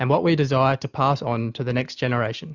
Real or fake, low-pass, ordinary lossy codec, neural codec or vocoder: real; 7.2 kHz; Opus, 64 kbps; none